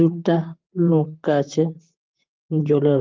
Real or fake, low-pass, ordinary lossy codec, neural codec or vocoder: fake; 7.2 kHz; Opus, 32 kbps; vocoder, 44.1 kHz, 128 mel bands, Pupu-Vocoder